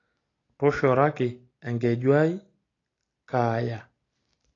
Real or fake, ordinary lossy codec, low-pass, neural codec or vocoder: real; AAC, 32 kbps; 7.2 kHz; none